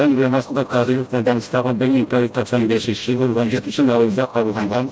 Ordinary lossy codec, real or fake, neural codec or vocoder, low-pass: none; fake; codec, 16 kHz, 0.5 kbps, FreqCodec, smaller model; none